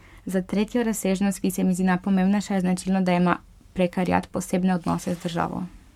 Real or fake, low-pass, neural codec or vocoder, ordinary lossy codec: fake; 19.8 kHz; codec, 44.1 kHz, 7.8 kbps, Pupu-Codec; MP3, 96 kbps